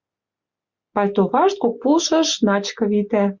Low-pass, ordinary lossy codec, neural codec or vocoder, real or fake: 7.2 kHz; Opus, 64 kbps; none; real